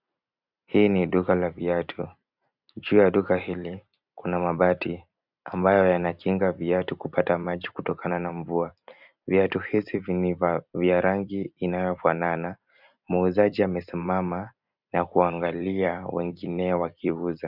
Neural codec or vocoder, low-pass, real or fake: vocoder, 44.1 kHz, 128 mel bands every 256 samples, BigVGAN v2; 5.4 kHz; fake